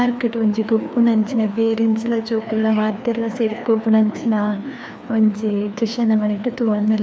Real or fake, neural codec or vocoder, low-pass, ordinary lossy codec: fake; codec, 16 kHz, 2 kbps, FreqCodec, larger model; none; none